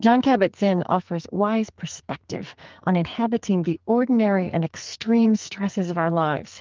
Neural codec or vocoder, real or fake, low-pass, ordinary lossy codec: codec, 44.1 kHz, 2.6 kbps, SNAC; fake; 7.2 kHz; Opus, 32 kbps